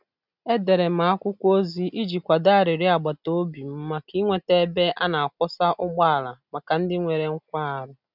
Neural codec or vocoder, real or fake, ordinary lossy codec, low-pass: none; real; none; 5.4 kHz